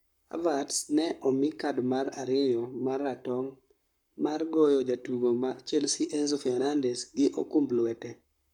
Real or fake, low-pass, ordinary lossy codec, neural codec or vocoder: fake; 19.8 kHz; none; codec, 44.1 kHz, 7.8 kbps, Pupu-Codec